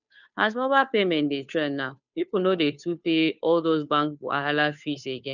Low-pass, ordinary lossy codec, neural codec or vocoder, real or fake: 7.2 kHz; none; codec, 16 kHz, 2 kbps, FunCodec, trained on Chinese and English, 25 frames a second; fake